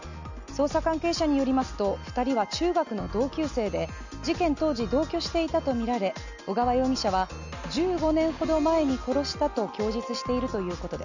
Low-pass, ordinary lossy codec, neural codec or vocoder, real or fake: 7.2 kHz; none; none; real